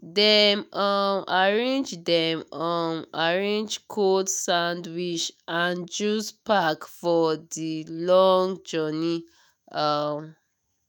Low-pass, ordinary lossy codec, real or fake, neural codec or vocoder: none; none; fake; autoencoder, 48 kHz, 128 numbers a frame, DAC-VAE, trained on Japanese speech